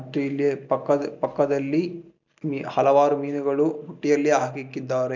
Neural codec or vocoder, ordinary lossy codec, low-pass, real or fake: none; none; 7.2 kHz; real